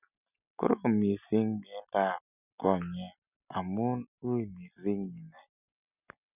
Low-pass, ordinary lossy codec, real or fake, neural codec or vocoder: 3.6 kHz; none; real; none